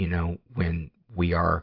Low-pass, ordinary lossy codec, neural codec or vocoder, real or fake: 5.4 kHz; AAC, 48 kbps; none; real